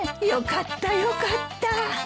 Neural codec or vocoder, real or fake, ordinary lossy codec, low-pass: none; real; none; none